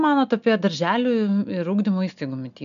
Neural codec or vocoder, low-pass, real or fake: none; 7.2 kHz; real